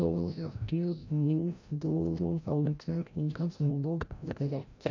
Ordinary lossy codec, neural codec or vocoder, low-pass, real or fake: none; codec, 16 kHz, 0.5 kbps, FreqCodec, larger model; 7.2 kHz; fake